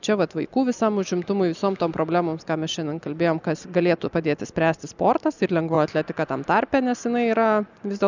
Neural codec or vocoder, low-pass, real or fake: none; 7.2 kHz; real